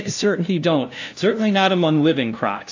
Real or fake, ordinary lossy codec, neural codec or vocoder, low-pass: fake; AAC, 48 kbps; codec, 16 kHz, 0.5 kbps, FunCodec, trained on LibriTTS, 25 frames a second; 7.2 kHz